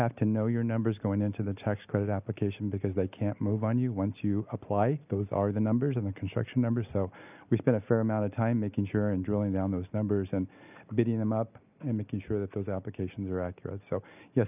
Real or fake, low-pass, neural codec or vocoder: real; 3.6 kHz; none